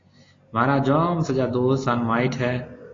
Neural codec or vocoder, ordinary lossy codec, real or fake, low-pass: none; AAC, 32 kbps; real; 7.2 kHz